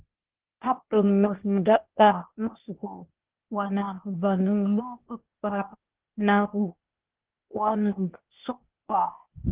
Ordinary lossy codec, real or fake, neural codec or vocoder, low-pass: Opus, 16 kbps; fake; codec, 16 kHz, 0.8 kbps, ZipCodec; 3.6 kHz